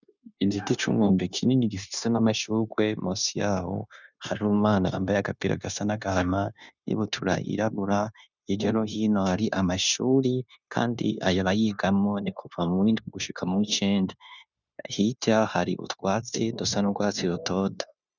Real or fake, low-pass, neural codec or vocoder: fake; 7.2 kHz; codec, 16 kHz, 0.9 kbps, LongCat-Audio-Codec